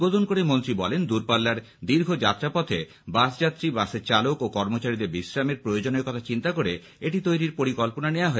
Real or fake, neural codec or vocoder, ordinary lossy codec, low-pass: real; none; none; none